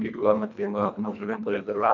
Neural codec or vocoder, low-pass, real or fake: codec, 24 kHz, 1.5 kbps, HILCodec; 7.2 kHz; fake